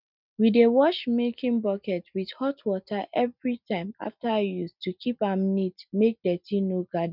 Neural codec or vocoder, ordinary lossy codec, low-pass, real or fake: none; none; 5.4 kHz; real